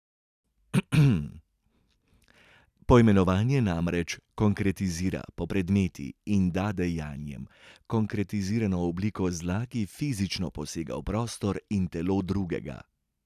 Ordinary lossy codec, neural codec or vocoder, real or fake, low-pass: none; none; real; 14.4 kHz